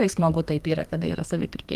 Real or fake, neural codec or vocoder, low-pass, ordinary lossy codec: fake; codec, 32 kHz, 1.9 kbps, SNAC; 14.4 kHz; Opus, 32 kbps